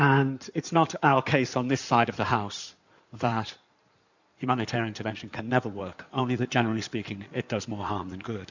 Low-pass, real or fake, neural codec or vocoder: 7.2 kHz; fake; codec, 16 kHz in and 24 kHz out, 2.2 kbps, FireRedTTS-2 codec